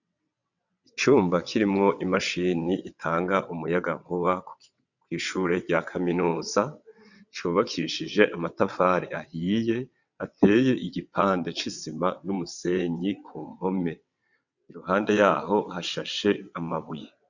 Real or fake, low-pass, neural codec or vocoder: fake; 7.2 kHz; vocoder, 22.05 kHz, 80 mel bands, WaveNeXt